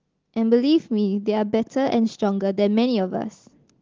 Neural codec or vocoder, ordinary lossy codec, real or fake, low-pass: none; Opus, 16 kbps; real; 7.2 kHz